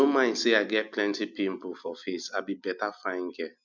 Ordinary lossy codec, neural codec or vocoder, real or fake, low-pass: none; none; real; 7.2 kHz